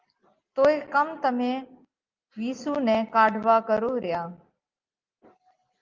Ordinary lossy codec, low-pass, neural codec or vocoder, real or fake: Opus, 32 kbps; 7.2 kHz; none; real